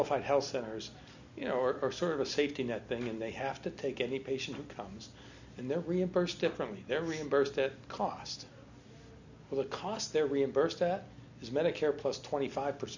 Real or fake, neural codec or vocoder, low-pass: real; none; 7.2 kHz